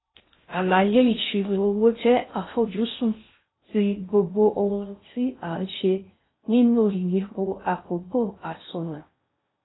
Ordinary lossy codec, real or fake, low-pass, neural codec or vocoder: AAC, 16 kbps; fake; 7.2 kHz; codec, 16 kHz in and 24 kHz out, 0.6 kbps, FocalCodec, streaming, 2048 codes